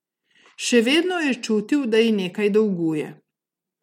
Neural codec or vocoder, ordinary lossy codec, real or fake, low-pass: none; MP3, 64 kbps; real; 19.8 kHz